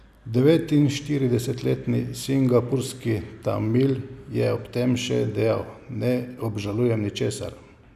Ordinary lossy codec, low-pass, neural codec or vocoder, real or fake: none; 14.4 kHz; none; real